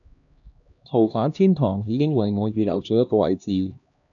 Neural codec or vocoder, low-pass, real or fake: codec, 16 kHz, 1 kbps, X-Codec, HuBERT features, trained on LibriSpeech; 7.2 kHz; fake